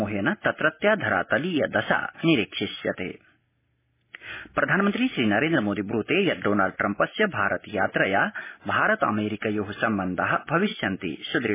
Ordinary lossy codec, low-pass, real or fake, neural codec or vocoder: MP3, 16 kbps; 3.6 kHz; real; none